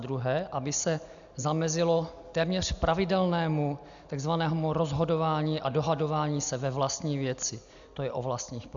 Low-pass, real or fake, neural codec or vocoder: 7.2 kHz; real; none